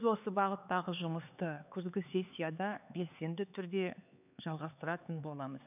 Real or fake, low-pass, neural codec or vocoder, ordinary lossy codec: fake; 3.6 kHz; codec, 16 kHz, 4 kbps, X-Codec, HuBERT features, trained on LibriSpeech; none